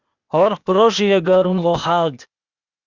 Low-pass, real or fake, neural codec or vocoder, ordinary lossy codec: 7.2 kHz; fake; codec, 16 kHz, 0.8 kbps, ZipCodec; Opus, 64 kbps